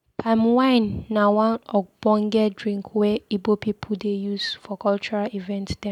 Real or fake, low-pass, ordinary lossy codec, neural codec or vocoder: real; 19.8 kHz; none; none